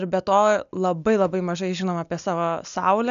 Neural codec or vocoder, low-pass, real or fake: none; 7.2 kHz; real